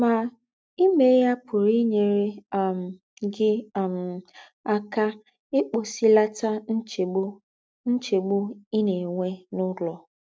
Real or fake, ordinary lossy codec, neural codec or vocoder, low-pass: real; none; none; none